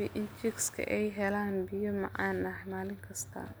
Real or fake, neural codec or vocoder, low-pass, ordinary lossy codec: real; none; none; none